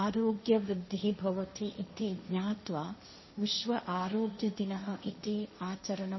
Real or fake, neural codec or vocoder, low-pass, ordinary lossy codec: fake; codec, 16 kHz, 1.1 kbps, Voila-Tokenizer; 7.2 kHz; MP3, 24 kbps